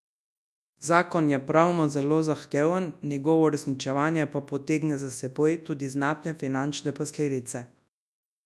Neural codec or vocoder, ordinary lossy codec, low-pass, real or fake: codec, 24 kHz, 0.9 kbps, WavTokenizer, large speech release; none; none; fake